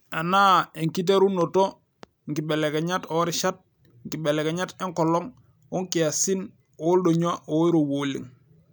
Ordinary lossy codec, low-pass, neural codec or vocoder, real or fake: none; none; none; real